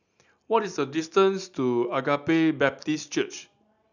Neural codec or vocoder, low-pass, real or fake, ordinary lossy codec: none; 7.2 kHz; real; none